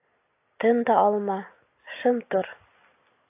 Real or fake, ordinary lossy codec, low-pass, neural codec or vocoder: real; AAC, 24 kbps; 3.6 kHz; none